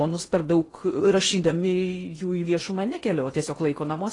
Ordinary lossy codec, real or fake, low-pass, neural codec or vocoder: AAC, 32 kbps; fake; 10.8 kHz; codec, 16 kHz in and 24 kHz out, 0.8 kbps, FocalCodec, streaming, 65536 codes